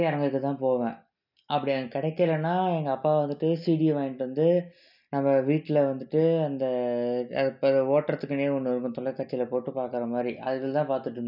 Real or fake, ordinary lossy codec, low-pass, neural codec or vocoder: real; none; 5.4 kHz; none